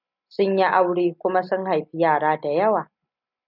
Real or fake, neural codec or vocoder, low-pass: real; none; 5.4 kHz